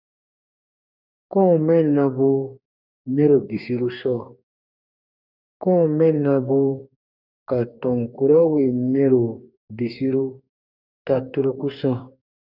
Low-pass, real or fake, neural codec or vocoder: 5.4 kHz; fake; codec, 44.1 kHz, 2.6 kbps, DAC